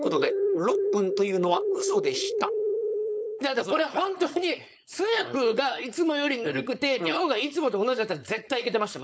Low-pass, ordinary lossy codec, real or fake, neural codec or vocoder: none; none; fake; codec, 16 kHz, 4.8 kbps, FACodec